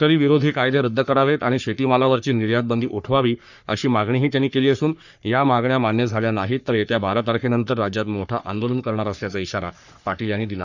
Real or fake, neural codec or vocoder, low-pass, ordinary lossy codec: fake; codec, 44.1 kHz, 3.4 kbps, Pupu-Codec; 7.2 kHz; none